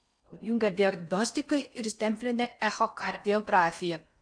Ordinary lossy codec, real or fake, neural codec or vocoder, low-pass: AAC, 64 kbps; fake; codec, 16 kHz in and 24 kHz out, 0.6 kbps, FocalCodec, streaming, 4096 codes; 9.9 kHz